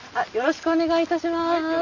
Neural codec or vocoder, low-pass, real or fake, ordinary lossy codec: none; 7.2 kHz; real; none